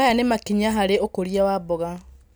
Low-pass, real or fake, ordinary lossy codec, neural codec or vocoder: none; real; none; none